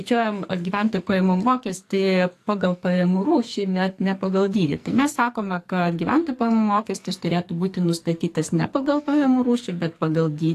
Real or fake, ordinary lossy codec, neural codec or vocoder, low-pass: fake; AAC, 64 kbps; codec, 44.1 kHz, 2.6 kbps, SNAC; 14.4 kHz